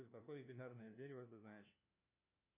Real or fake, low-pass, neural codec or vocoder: fake; 3.6 kHz; codec, 16 kHz, 1 kbps, FunCodec, trained on LibriTTS, 50 frames a second